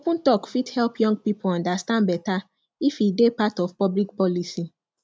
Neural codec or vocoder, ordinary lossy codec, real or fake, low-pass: none; none; real; none